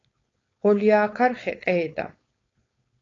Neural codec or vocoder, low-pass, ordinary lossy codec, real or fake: codec, 16 kHz, 4.8 kbps, FACodec; 7.2 kHz; AAC, 32 kbps; fake